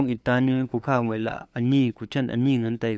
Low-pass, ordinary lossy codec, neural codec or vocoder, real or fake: none; none; codec, 16 kHz, 2 kbps, FunCodec, trained on LibriTTS, 25 frames a second; fake